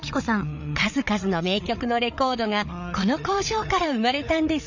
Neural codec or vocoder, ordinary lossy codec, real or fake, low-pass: codec, 16 kHz, 8 kbps, FreqCodec, larger model; none; fake; 7.2 kHz